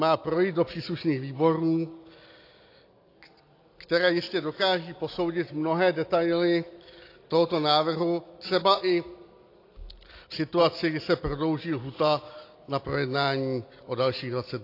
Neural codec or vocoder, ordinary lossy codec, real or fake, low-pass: none; AAC, 32 kbps; real; 5.4 kHz